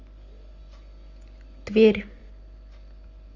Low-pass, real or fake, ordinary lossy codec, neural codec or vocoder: 7.2 kHz; real; Opus, 32 kbps; none